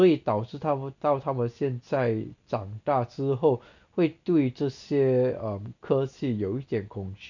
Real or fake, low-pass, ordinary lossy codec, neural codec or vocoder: real; 7.2 kHz; none; none